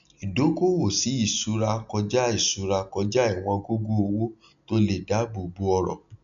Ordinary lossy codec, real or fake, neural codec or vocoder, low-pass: none; real; none; 7.2 kHz